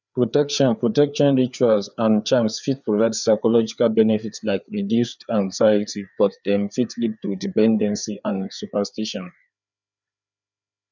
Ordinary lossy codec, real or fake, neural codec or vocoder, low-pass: none; fake; codec, 16 kHz, 4 kbps, FreqCodec, larger model; 7.2 kHz